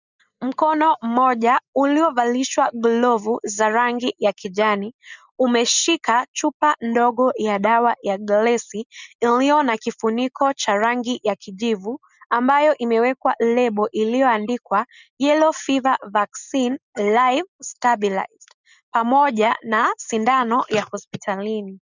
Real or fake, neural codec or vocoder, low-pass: real; none; 7.2 kHz